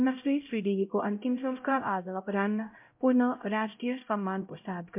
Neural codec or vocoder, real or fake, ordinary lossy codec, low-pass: codec, 16 kHz, 0.5 kbps, X-Codec, HuBERT features, trained on LibriSpeech; fake; MP3, 32 kbps; 3.6 kHz